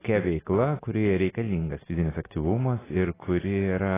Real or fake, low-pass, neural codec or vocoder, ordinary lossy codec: real; 3.6 kHz; none; AAC, 16 kbps